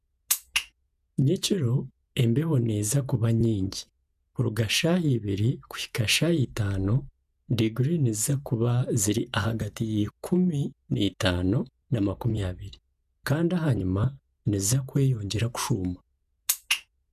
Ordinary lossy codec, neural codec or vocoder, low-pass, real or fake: none; vocoder, 48 kHz, 128 mel bands, Vocos; 14.4 kHz; fake